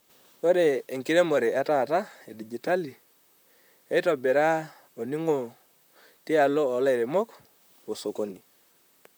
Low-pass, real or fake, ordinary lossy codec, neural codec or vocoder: none; fake; none; vocoder, 44.1 kHz, 128 mel bands, Pupu-Vocoder